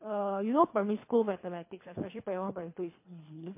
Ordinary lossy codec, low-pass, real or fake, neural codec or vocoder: MP3, 24 kbps; 3.6 kHz; fake; codec, 24 kHz, 3 kbps, HILCodec